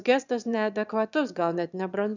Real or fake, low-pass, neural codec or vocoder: fake; 7.2 kHz; autoencoder, 22.05 kHz, a latent of 192 numbers a frame, VITS, trained on one speaker